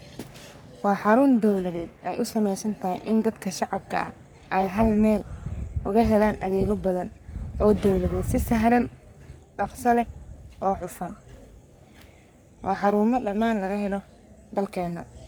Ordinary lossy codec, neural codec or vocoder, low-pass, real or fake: none; codec, 44.1 kHz, 3.4 kbps, Pupu-Codec; none; fake